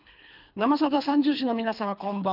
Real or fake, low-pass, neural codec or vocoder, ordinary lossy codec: fake; 5.4 kHz; codec, 24 kHz, 3 kbps, HILCodec; none